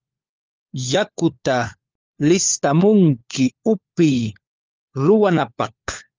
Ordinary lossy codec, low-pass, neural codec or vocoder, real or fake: Opus, 32 kbps; 7.2 kHz; codec, 16 kHz, 4 kbps, FunCodec, trained on LibriTTS, 50 frames a second; fake